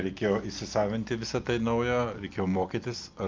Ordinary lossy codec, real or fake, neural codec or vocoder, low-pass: Opus, 24 kbps; real; none; 7.2 kHz